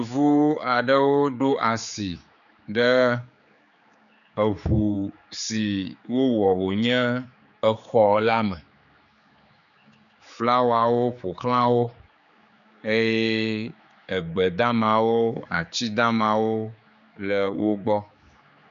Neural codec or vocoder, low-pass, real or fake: codec, 16 kHz, 4 kbps, X-Codec, HuBERT features, trained on general audio; 7.2 kHz; fake